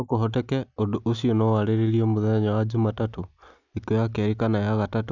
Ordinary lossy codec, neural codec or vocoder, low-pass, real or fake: none; none; 7.2 kHz; real